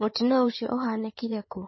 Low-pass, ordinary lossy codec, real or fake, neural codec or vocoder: 7.2 kHz; MP3, 24 kbps; fake; codec, 16 kHz in and 24 kHz out, 2.2 kbps, FireRedTTS-2 codec